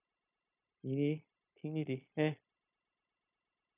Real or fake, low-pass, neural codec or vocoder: fake; 3.6 kHz; codec, 16 kHz, 0.9 kbps, LongCat-Audio-Codec